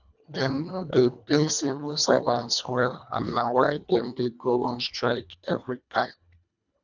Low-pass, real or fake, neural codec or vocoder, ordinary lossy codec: 7.2 kHz; fake; codec, 24 kHz, 1.5 kbps, HILCodec; none